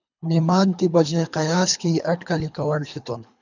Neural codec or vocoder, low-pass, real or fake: codec, 24 kHz, 3 kbps, HILCodec; 7.2 kHz; fake